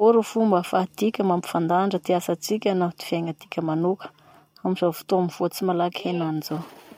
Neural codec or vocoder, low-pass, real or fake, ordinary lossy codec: none; 19.8 kHz; real; MP3, 64 kbps